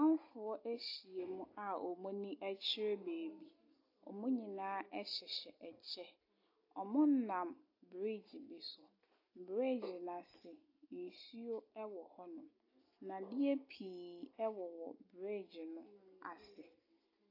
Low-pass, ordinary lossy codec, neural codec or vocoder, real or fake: 5.4 kHz; AAC, 32 kbps; none; real